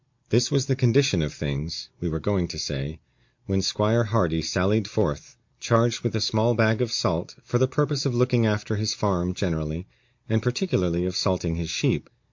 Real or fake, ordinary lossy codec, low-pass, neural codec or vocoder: real; MP3, 48 kbps; 7.2 kHz; none